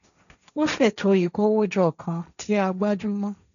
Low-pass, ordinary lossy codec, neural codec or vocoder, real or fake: 7.2 kHz; none; codec, 16 kHz, 1.1 kbps, Voila-Tokenizer; fake